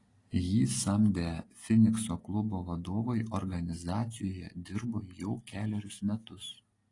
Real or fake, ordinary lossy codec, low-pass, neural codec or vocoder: real; AAC, 32 kbps; 10.8 kHz; none